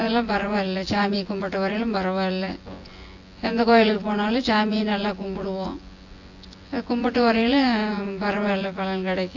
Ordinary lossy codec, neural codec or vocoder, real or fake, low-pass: AAC, 48 kbps; vocoder, 24 kHz, 100 mel bands, Vocos; fake; 7.2 kHz